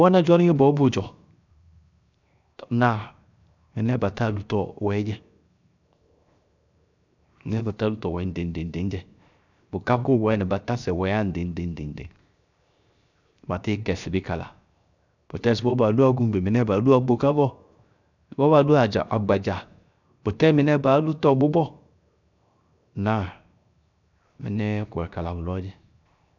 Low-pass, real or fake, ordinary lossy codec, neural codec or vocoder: 7.2 kHz; fake; none; codec, 16 kHz, 0.7 kbps, FocalCodec